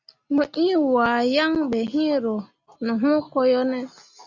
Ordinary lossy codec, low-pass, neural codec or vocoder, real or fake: Opus, 64 kbps; 7.2 kHz; none; real